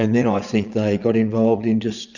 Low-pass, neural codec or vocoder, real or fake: 7.2 kHz; vocoder, 22.05 kHz, 80 mel bands, Vocos; fake